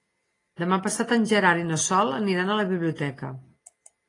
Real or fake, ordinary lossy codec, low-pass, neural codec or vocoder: real; AAC, 32 kbps; 10.8 kHz; none